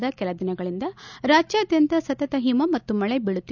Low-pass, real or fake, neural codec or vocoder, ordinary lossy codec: 7.2 kHz; real; none; none